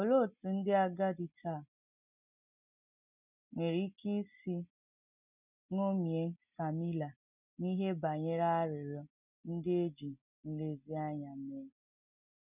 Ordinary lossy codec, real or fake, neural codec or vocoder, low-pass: none; real; none; 3.6 kHz